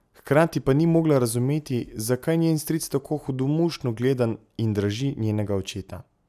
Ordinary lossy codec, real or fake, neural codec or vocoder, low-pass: none; real; none; 14.4 kHz